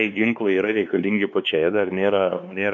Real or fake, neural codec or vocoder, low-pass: fake; codec, 16 kHz, 2 kbps, X-Codec, WavLM features, trained on Multilingual LibriSpeech; 7.2 kHz